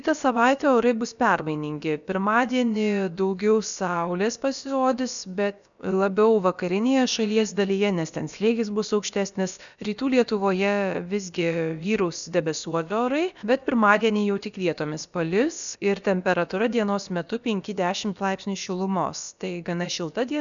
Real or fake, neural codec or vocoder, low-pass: fake; codec, 16 kHz, about 1 kbps, DyCAST, with the encoder's durations; 7.2 kHz